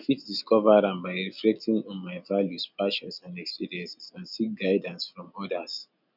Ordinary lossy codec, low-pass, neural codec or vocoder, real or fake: none; 5.4 kHz; none; real